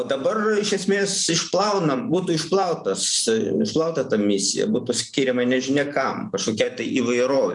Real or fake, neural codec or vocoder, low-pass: fake; vocoder, 44.1 kHz, 128 mel bands every 512 samples, BigVGAN v2; 10.8 kHz